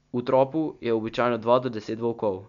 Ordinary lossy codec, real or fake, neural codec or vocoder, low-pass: none; real; none; 7.2 kHz